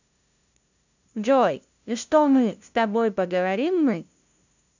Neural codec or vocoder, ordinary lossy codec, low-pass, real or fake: codec, 16 kHz, 0.5 kbps, FunCodec, trained on LibriTTS, 25 frames a second; none; 7.2 kHz; fake